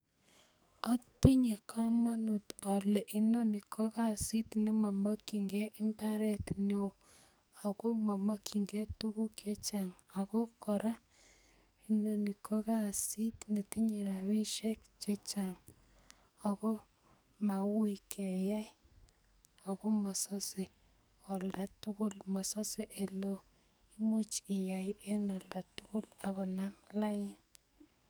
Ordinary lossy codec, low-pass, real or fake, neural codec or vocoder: none; none; fake; codec, 44.1 kHz, 2.6 kbps, SNAC